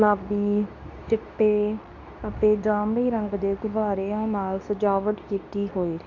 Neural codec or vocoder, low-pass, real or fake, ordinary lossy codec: codec, 24 kHz, 0.9 kbps, WavTokenizer, medium speech release version 2; 7.2 kHz; fake; none